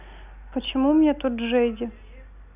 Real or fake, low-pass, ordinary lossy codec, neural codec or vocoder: real; 3.6 kHz; none; none